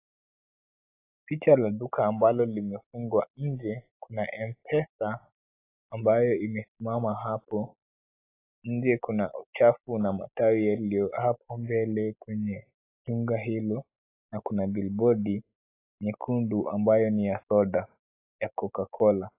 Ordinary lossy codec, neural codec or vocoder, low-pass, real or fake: AAC, 24 kbps; none; 3.6 kHz; real